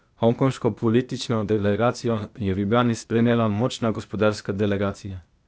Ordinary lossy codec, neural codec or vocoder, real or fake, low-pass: none; codec, 16 kHz, 0.8 kbps, ZipCodec; fake; none